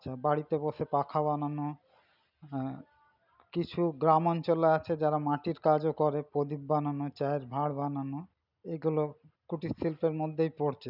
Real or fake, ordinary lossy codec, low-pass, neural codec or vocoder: real; none; 5.4 kHz; none